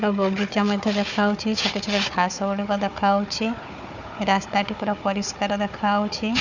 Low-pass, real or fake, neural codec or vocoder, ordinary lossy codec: 7.2 kHz; fake; codec, 16 kHz, 4 kbps, FunCodec, trained on Chinese and English, 50 frames a second; none